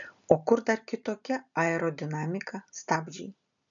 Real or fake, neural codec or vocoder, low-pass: real; none; 7.2 kHz